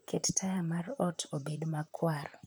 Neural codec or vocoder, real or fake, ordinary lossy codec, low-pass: vocoder, 44.1 kHz, 128 mel bands, Pupu-Vocoder; fake; none; none